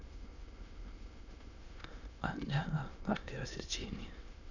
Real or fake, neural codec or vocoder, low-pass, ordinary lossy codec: fake; autoencoder, 22.05 kHz, a latent of 192 numbers a frame, VITS, trained on many speakers; 7.2 kHz; none